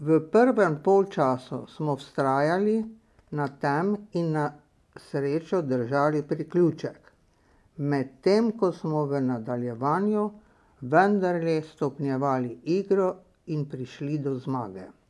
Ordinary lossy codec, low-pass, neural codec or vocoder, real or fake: none; none; none; real